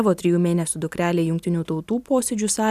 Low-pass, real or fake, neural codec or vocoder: 14.4 kHz; real; none